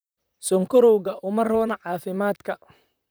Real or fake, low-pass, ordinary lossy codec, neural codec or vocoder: fake; none; none; vocoder, 44.1 kHz, 128 mel bands, Pupu-Vocoder